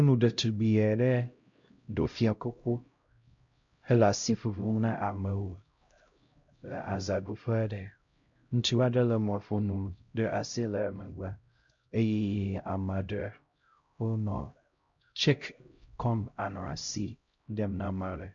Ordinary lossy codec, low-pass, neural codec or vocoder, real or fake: MP3, 48 kbps; 7.2 kHz; codec, 16 kHz, 0.5 kbps, X-Codec, HuBERT features, trained on LibriSpeech; fake